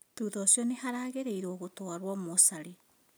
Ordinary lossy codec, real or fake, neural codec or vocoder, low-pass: none; real; none; none